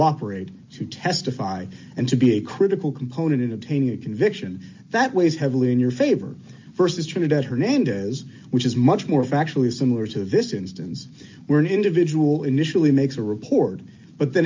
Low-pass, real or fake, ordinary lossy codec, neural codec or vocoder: 7.2 kHz; real; MP3, 48 kbps; none